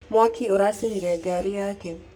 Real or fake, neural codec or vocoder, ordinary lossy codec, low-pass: fake; codec, 44.1 kHz, 3.4 kbps, Pupu-Codec; none; none